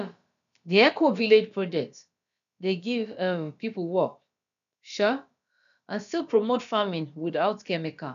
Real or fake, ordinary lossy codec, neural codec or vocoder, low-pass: fake; none; codec, 16 kHz, about 1 kbps, DyCAST, with the encoder's durations; 7.2 kHz